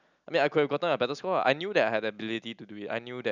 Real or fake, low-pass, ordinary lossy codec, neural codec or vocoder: real; 7.2 kHz; none; none